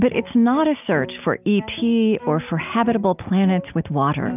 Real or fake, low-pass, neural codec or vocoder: real; 3.6 kHz; none